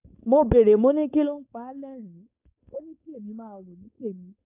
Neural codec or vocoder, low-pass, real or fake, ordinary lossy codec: codec, 16 kHz, 4.8 kbps, FACodec; 3.6 kHz; fake; AAC, 32 kbps